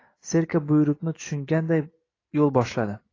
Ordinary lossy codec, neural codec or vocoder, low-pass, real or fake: AAC, 32 kbps; none; 7.2 kHz; real